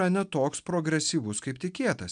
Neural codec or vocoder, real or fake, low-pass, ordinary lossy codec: none; real; 9.9 kHz; MP3, 96 kbps